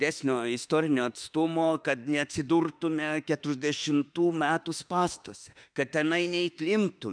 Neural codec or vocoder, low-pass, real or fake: autoencoder, 48 kHz, 32 numbers a frame, DAC-VAE, trained on Japanese speech; 9.9 kHz; fake